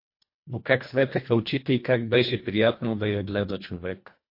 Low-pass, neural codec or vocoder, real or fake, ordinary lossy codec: 5.4 kHz; codec, 24 kHz, 1.5 kbps, HILCodec; fake; MP3, 32 kbps